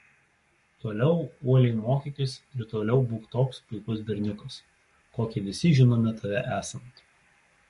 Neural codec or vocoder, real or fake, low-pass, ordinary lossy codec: codec, 44.1 kHz, 7.8 kbps, Pupu-Codec; fake; 14.4 kHz; MP3, 48 kbps